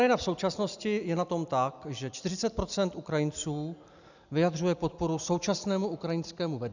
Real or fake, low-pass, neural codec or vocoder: real; 7.2 kHz; none